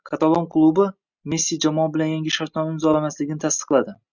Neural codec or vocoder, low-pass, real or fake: none; 7.2 kHz; real